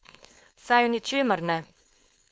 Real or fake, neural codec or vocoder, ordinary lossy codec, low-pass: fake; codec, 16 kHz, 4.8 kbps, FACodec; none; none